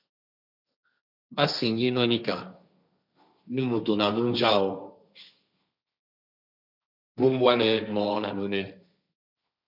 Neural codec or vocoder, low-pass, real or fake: codec, 16 kHz, 1.1 kbps, Voila-Tokenizer; 5.4 kHz; fake